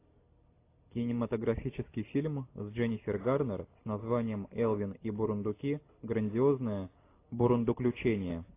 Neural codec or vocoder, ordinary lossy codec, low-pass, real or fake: none; AAC, 24 kbps; 3.6 kHz; real